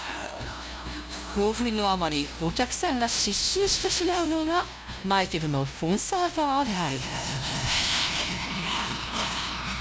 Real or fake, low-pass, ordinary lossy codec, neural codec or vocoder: fake; none; none; codec, 16 kHz, 0.5 kbps, FunCodec, trained on LibriTTS, 25 frames a second